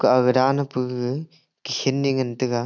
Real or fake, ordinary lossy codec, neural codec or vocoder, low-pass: real; none; none; 7.2 kHz